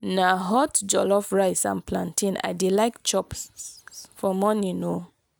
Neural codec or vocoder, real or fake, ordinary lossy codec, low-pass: none; real; none; none